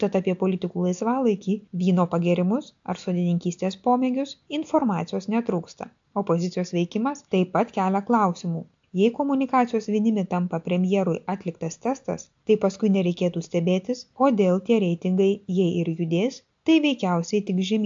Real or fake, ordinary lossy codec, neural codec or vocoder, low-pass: real; AAC, 64 kbps; none; 7.2 kHz